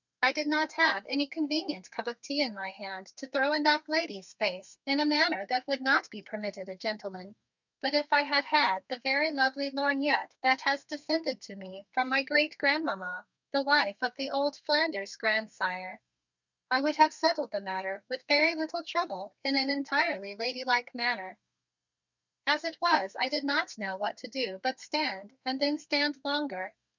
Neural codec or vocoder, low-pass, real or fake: codec, 32 kHz, 1.9 kbps, SNAC; 7.2 kHz; fake